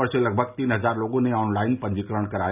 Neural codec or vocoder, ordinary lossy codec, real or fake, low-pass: none; none; real; 3.6 kHz